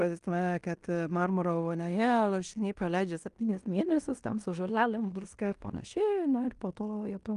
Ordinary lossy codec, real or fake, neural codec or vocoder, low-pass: Opus, 32 kbps; fake; codec, 16 kHz in and 24 kHz out, 0.9 kbps, LongCat-Audio-Codec, fine tuned four codebook decoder; 10.8 kHz